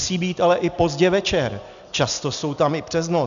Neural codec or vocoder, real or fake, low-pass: none; real; 7.2 kHz